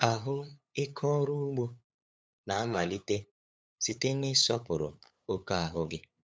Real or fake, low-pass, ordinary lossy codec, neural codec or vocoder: fake; none; none; codec, 16 kHz, 8 kbps, FunCodec, trained on LibriTTS, 25 frames a second